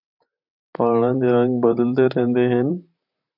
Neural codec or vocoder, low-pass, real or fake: vocoder, 44.1 kHz, 128 mel bands, Pupu-Vocoder; 5.4 kHz; fake